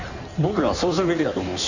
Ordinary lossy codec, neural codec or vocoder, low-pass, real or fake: Opus, 64 kbps; codec, 16 kHz in and 24 kHz out, 1.1 kbps, FireRedTTS-2 codec; 7.2 kHz; fake